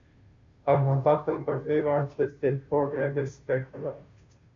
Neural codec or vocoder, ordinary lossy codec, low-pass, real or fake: codec, 16 kHz, 0.5 kbps, FunCodec, trained on Chinese and English, 25 frames a second; MP3, 48 kbps; 7.2 kHz; fake